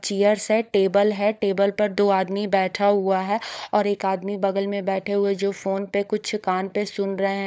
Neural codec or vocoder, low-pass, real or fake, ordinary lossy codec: codec, 16 kHz, 16 kbps, FunCodec, trained on LibriTTS, 50 frames a second; none; fake; none